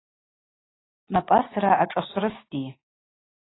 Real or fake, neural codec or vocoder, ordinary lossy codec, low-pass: real; none; AAC, 16 kbps; 7.2 kHz